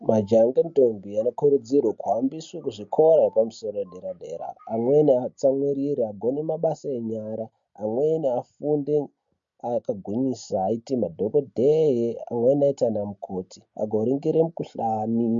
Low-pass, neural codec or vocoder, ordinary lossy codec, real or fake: 7.2 kHz; none; MP3, 48 kbps; real